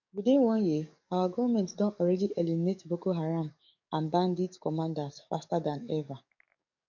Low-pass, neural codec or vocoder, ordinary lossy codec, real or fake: 7.2 kHz; codec, 44.1 kHz, 7.8 kbps, DAC; none; fake